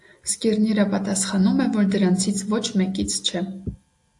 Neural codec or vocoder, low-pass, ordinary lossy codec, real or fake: none; 10.8 kHz; AAC, 64 kbps; real